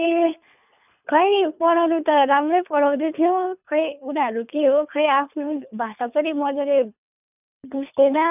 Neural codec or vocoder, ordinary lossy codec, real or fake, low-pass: codec, 24 kHz, 3 kbps, HILCodec; none; fake; 3.6 kHz